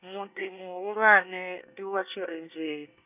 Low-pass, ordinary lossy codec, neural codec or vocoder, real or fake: 3.6 kHz; none; codec, 24 kHz, 1 kbps, SNAC; fake